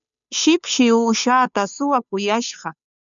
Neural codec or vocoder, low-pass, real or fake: codec, 16 kHz, 2 kbps, FunCodec, trained on Chinese and English, 25 frames a second; 7.2 kHz; fake